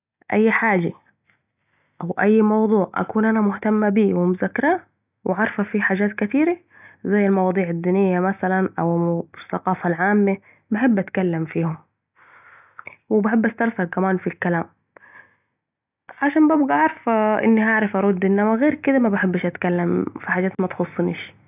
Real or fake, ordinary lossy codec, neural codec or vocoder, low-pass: real; none; none; 3.6 kHz